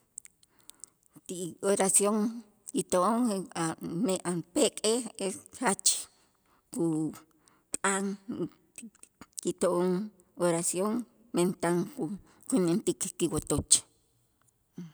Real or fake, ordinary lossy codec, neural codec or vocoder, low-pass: real; none; none; none